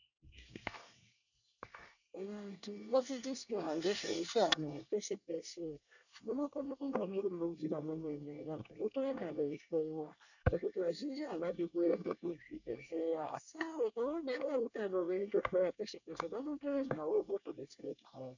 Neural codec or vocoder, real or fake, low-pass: codec, 24 kHz, 1 kbps, SNAC; fake; 7.2 kHz